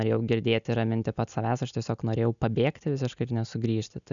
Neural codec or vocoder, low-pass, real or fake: none; 7.2 kHz; real